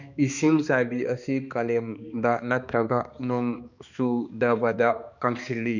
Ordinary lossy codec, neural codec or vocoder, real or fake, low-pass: none; codec, 16 kHz, 2 kbps, X-Codec, HuBERT features, trained on balanced general audio; fake; 7.2 kHz